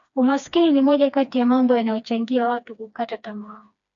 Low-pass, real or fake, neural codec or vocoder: 7.2 kHz; fake; codec, 16 kHz, 2 kbps, FreqCodec, smaller model